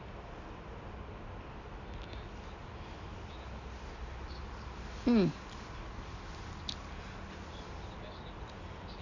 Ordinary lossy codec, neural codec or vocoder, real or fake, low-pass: none; none; real; 7.2 kHz